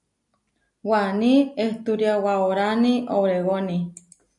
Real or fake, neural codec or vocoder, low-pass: real; none; 10.8 kHz